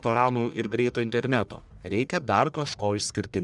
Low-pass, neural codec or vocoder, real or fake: 10.8 kHz; codec, 44.1 kHz, 1.7 kbps, Pupu-Codec; fake